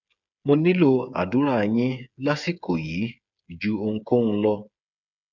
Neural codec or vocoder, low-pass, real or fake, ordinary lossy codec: codec, 16 kHz, 8 kbps, FreqCodec, smaller model; 7.2 kHz; fake; none